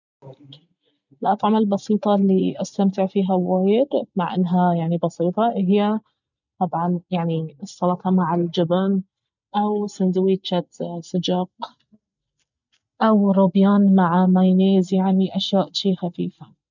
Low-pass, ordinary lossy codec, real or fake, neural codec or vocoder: 7.2 kHz; none; real; none